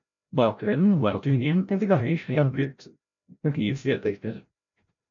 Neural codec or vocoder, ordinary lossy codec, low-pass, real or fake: codec, 16 kHz, 0.5 kbps, FreqCodec, larger model; MP3, 96 kbps; 7.2 kHz; fake